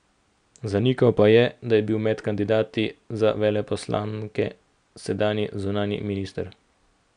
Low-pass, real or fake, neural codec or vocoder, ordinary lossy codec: 9.9 kHz; real; none; none